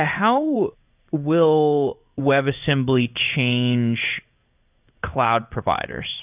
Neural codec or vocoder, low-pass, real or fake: codec, 16 kHz in and 24 kHz out, 1 kbps, XY-Tokenizer; 3.6 kHz; fake